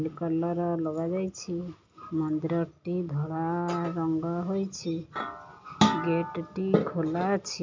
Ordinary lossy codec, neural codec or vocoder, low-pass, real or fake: MP3, 48 kbps; none; 7.2 kHz; real